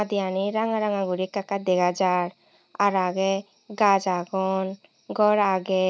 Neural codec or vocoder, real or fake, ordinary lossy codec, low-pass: none; real; none; none